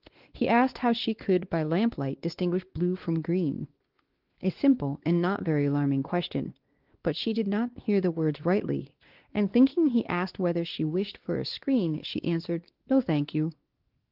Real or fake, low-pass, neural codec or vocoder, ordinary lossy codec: fake; 5.4 kHz; codec, 16 kHz, 2 kbps, X-Codec, WavLM features, trained on Multilingual LibriSpeech; Opus, 16 kbps